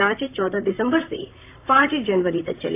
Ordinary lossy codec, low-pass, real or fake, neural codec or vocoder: AAC, 24 kbps; 3.6 kHz; fake; vocoder, 44.1 kHz, 128 mel bands, Pupu-Vocoder